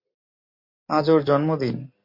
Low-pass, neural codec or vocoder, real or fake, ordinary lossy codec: 5.4 kHz; none; real; MP3, 32 kbps